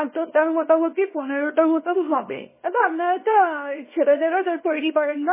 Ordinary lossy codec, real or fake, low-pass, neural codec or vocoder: MP3, 16 kbps; fake; 3.6 kHz; codec, 24 kHz, 0.9 kbps, WavTokenizer, small release